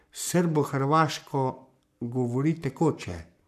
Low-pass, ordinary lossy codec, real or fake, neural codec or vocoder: 14.4 kHz; none; fake; codec, 44.1 kHz, 7.8 kbps, Pupu-Codec